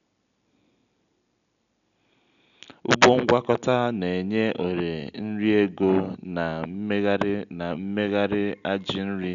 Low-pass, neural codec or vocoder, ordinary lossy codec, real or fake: 7.2 kHz; none; none; real